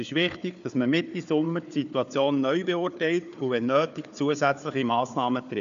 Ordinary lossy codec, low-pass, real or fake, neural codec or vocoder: MP3, 96 kbps; 7.2 kHz; fake; codec, 16 kHz, 4 kbps, FunCodec, trained on Chinese and English, 50 frames a second